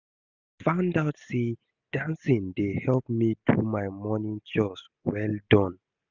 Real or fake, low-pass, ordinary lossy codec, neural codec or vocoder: real; 7.2 kHz; none; none